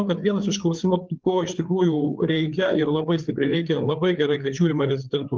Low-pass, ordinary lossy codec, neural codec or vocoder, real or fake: 7.2 kHz; Opus, 32 kbps; codec, 16 kHz, 4 kbps, FreqCodec, larger model; fake